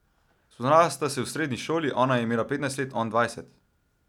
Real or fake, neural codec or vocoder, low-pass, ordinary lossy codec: real; none; 19.8 kHz; none